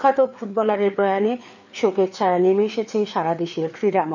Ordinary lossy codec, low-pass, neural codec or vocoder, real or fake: none; 7.2 kHz; codec, 16 kHz, 4 kbps, FreqCodec, larger model; fake